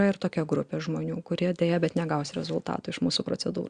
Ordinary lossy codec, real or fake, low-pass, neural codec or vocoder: Opus, 64 kbps; real; 9.9 kHz; none